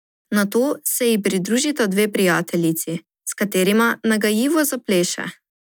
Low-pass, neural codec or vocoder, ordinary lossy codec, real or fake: none; none; none; real